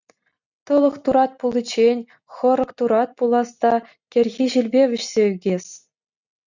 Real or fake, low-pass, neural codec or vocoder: real; 7.2 kHz; none